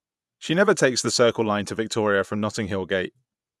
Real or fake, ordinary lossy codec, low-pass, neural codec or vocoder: fake; none; none; vocoder, 24 kHz, 100 mel bands, Vocos